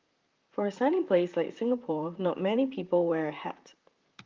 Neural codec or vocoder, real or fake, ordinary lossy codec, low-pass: vocoder, 44.1 kHz, 128 mel bands, Pupu-Vocoder; fake; Opus, 24 kbps; 7.2 kHz